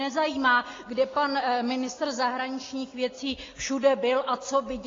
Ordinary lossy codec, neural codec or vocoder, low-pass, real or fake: AAC, 32 kbps; none; 7.2 kHz; real